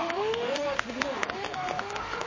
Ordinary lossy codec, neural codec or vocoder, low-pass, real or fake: MP3, 32 kbps; autoencoder, 48 kHz, 32 numbers a frame, DAC-VAE, trained on Japanese speech; 7.2 kHz; fake